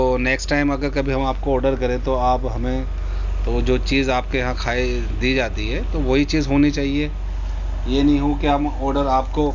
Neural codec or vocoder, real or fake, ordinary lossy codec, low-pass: none; real; none; 7.2 kHz